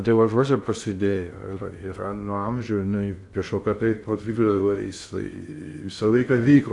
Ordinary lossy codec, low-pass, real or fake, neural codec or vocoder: AAC, 64 kbps; 10.8 kHz; fake; codec, 16 kHz in and 24 kHz out, 0.6 kbps, FocalCodec, streaming, 2048 codes